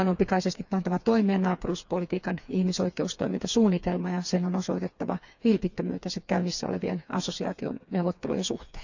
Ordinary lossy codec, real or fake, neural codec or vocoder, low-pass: none; fake; codec, 16 kHz, 4 kbps, FreqCodec, smaller model; 7.2 kHz